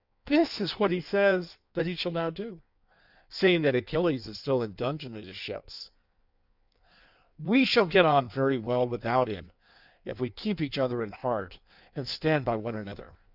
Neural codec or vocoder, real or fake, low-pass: codec, 16 kHz in and 24 kHz out, 1.1 kbps, FireRedTTS-2 codec; fake; 5.4 kHz